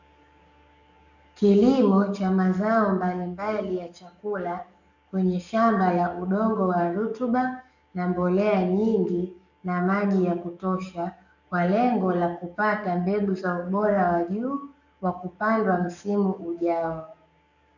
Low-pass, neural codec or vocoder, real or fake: 7.2 kHz; codec, 44.1 kHz, 7.8 kbps, DAC; fake